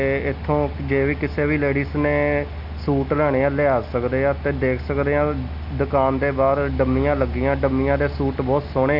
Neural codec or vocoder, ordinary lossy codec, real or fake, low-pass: none; none; real; 5.4 kHz